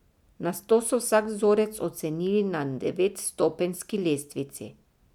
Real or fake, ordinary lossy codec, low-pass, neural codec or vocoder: real; none; 19.8 kHz; none